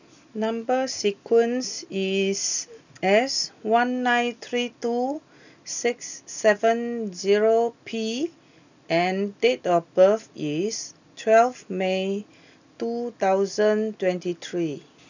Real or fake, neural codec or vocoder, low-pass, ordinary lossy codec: real; none; 7.2 kHz; none